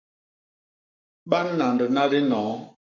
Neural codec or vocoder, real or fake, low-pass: codec, 44.1 kHz, 7.8 kbps, Pupu-Codec; fake; 7.2 kHz